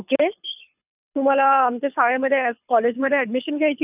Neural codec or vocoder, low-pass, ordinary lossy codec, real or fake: codec, 24 kHz, 6 kbps, HILCodec; 3.6 kHz; none; fake